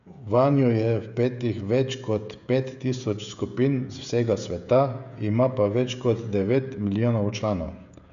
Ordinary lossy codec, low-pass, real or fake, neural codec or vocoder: none; 7.2 kHz; fake; codec, 16 kHz, 16 kbps, FreqCodec, smaller model